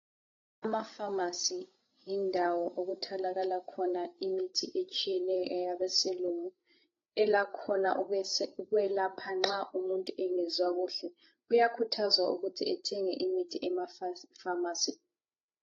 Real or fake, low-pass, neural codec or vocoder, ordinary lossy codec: fake; 7.2 kHz; codec, 16 kHz, 8 kbps, FreqCodec, larger model; AAC, 32 kbps